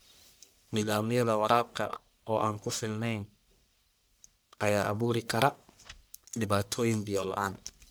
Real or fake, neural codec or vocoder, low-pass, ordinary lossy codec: fake; codec, 44.1 kHz, 1.7 kbps, Pupu-Codec; none; none